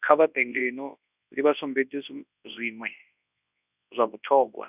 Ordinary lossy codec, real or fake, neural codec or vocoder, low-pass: none; fake; codec, 24 kHz, 0.9 kbps, WavTokenizer, large speech release; 3.6 kHz